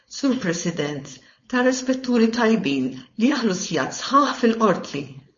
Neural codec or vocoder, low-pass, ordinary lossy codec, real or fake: codec, 16 kHz, 4.8 kbps, FACodec; 7.2 kHz; MP3, 32 kbps; fake